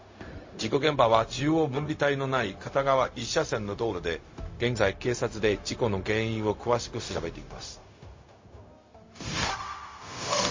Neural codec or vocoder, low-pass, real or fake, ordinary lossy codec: codec, 16 kHz, 0.4 kbps, LongCat-Audio-Codec; 7.2 kHz; fake; MP3, 32 kbps